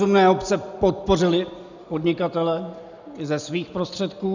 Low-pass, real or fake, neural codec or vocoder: 7.2 kHz; real; none